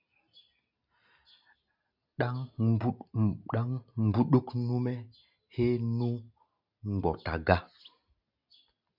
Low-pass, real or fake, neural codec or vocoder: 5.4 kHz; real; none